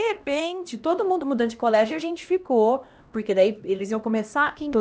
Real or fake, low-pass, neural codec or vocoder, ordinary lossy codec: fake; none; codec, 16 kHz, 1 kbps, X-Codec, HuBERT features, trained on LibriSpeech; none